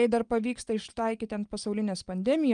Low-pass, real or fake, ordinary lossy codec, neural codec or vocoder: 9.9 kHz; real; Opus, 32 kbps; none